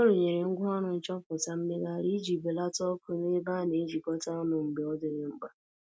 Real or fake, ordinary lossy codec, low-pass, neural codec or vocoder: real; none; none; none